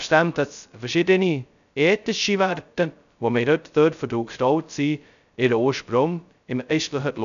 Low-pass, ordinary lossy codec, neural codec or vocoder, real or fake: 7.2 kHz; none; codec, 16 kHz, 0.2 kbps, FocalCodec; fake